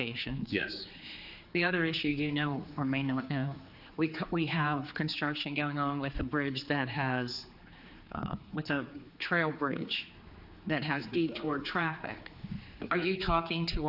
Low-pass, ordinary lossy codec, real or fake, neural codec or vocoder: 5.4 kHz; AAC, 48 kbps; fake; codec, 16 kHz, 4 kbps, X-Codec, HuBERT features, trained on general audio